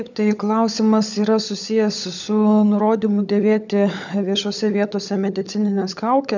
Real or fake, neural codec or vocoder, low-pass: fake; codec, 16 kHz, 16 kbps, FunCodec, trained on LibriTTS, 50 frames a second; 7.2 kHz